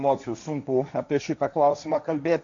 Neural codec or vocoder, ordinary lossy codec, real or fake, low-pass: codec, 16 kHz, 1.1 kbps, Voila-Tokenizer; MP3, 64 kbps; fake; 7.2 kHz